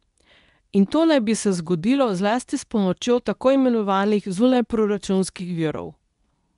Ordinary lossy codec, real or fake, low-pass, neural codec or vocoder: none; fake; 10.8 kHz; codec, 24 kHz, 0.9 kbps, WavTokenizer, medium speech release version 2